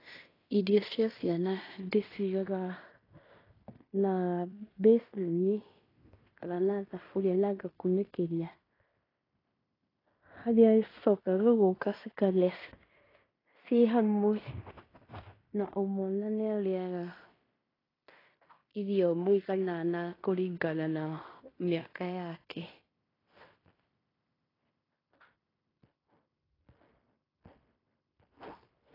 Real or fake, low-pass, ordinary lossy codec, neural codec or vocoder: fake; 5.4 kHz; AAC, 24 kbps; codec, 16 kHz in and 24 kHz out, 0.9 kbps, LongCat-Audio-Codec, fine tuned four codebook decoder